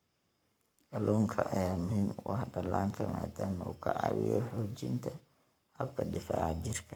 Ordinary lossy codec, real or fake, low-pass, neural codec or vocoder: none; fake; none; codec, 44.1 kHz, 7.8 kbps, Pupu-Codec